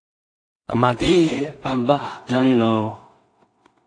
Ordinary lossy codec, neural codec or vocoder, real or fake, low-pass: AAC, 48 kbps; codec, 16 kHz in and 24 kHz out, 0.4 kbps, LongCat-Audio-Codec, two codebook decoder; fake; 9.9 kHz